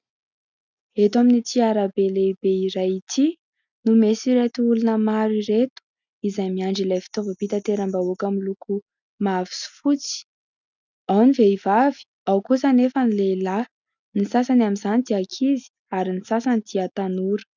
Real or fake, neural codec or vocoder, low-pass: real; none; 7.2 kHz